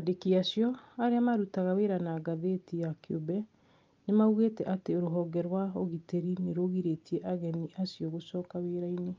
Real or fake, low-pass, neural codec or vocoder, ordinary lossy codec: real; 7.2 kHz; none; Opus, 24 kbps